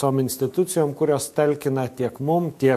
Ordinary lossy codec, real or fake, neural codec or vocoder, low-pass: MP3, 64 kbps; fake; autoencoder, 48 kHz, 128 numbers a frame, DAC-VAE, trained on Japanese speech; 14.4 kHz